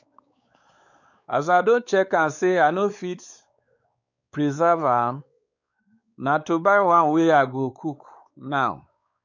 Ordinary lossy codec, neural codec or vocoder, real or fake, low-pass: none; codec, 16 kHz, 4 kbps, X-Codec, WavLM features, trained on Multilingual LibriSpeech; fake; 7.2 kHz